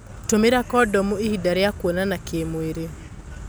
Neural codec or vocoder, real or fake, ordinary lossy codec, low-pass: none; real; none; none